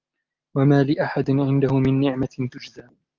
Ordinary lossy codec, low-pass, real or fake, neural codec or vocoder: Opus, 32 kbps; 7.2 kHz; real; none